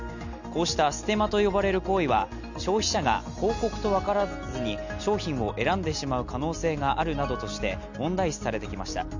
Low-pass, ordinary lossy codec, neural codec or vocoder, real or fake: 7.2 kHz; none; none; real